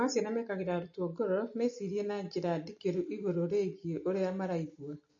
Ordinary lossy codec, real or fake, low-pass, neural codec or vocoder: MP3, 32 kbps; real; 7.2 kHz; none